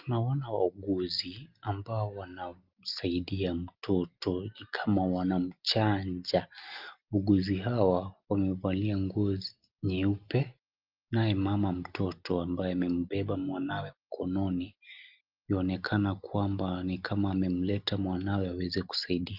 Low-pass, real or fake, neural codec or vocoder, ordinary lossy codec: 5.4 kHz; real; none; Opus, 32 kbps